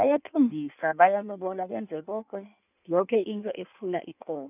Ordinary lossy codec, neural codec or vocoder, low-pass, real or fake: none; codec, 16 kHz in and 24 kHz out, 1.1 kbps, FireRedTTS-2 codec; 3.6 kHz; fake